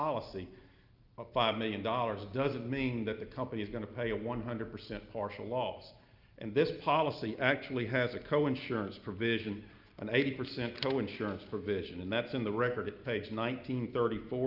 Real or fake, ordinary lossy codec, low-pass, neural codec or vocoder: real; Opus, 24 kbps; 5.4 kHz; none